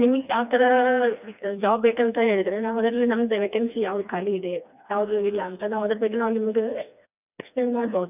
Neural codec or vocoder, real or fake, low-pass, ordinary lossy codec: codec, 16 kHz, 2 kbps, FreqCodec, smaller model; fake; 3.6 kHz; none